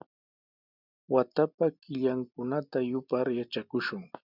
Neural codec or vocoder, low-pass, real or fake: none; 5.4 kHz; real